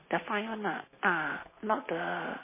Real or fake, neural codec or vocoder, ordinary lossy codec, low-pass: real; none; MP3, 16 kbps; 3.6 kHz